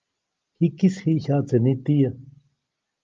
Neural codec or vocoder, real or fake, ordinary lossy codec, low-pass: none; real; Opus, 32 kbps; 7.2 kHz